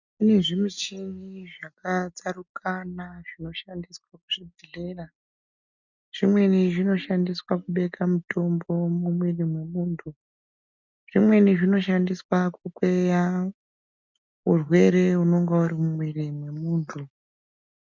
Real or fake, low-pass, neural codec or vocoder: real; 7.2 kHz; none